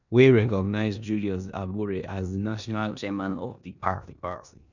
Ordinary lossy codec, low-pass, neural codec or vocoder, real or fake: none; 7.2 kHz; codec, 16 kHz in and 24 kHz out, 0.9 kbps, LongCat-Audio-Codec, four codebook decoder; fake